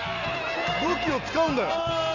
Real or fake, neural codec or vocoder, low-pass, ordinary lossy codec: real; none; 7.2 kHz; none